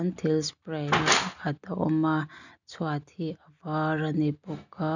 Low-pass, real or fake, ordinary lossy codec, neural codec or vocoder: 7.2 kHz; real; none; none